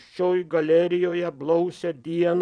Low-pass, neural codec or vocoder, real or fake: 9.9 kHz; vocoder, 22.05 kHz, 80 mel bands, Vocos; fake